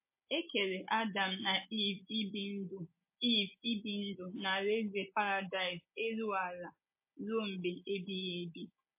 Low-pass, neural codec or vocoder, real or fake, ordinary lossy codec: 3.6 kHz; none; real; MP3, 24 kbps